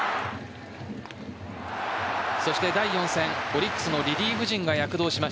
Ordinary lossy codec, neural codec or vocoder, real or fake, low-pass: none; none; real; none